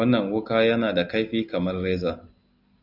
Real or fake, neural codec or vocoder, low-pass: real; none; 5.4 kHz